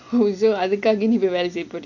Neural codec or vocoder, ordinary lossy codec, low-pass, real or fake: none; none; 7.2 kHz; real